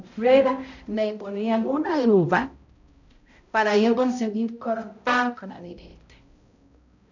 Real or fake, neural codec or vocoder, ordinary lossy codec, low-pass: fake; codec, 16 kHz, 0.5 kbps, X-Codec, HuBERT features, trained on balanced general audio; none; 7.2 kHz